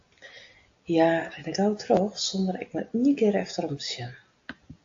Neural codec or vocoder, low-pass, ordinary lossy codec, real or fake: none; 7.2 kHz; AAC, 48 kbps; real